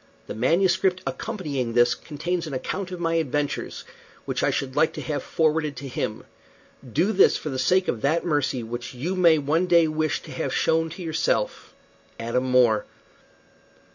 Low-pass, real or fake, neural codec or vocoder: 7.2 kHz; real; none